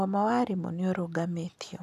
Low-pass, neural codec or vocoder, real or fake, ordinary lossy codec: 14.4 kHz; none; real; none